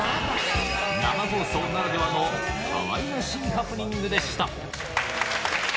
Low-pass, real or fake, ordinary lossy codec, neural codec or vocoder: none; real; none; none